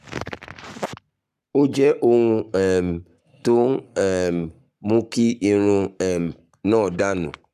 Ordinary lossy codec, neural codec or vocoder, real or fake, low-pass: none; codec, 44.1 kHz, 7.8 kbps, Pupu-Codec; fake; 14.4 kHz